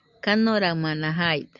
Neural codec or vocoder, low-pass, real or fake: none; 7.2 kHz; real